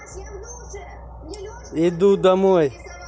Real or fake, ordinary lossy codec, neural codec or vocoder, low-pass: real; none; none; none